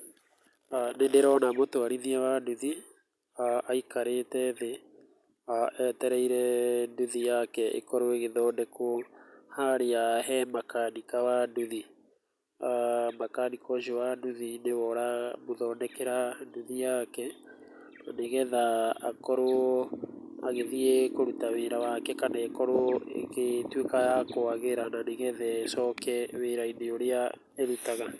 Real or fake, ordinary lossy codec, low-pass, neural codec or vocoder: real; none; none; none